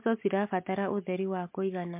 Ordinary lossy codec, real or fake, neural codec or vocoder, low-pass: MP3, 32 kbps; real; none; 3.6 kHz